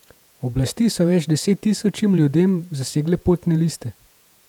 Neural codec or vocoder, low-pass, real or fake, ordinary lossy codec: vocoder, 48 kHz, 128 mel bands, Vocos; 19.8 kHz; fake; none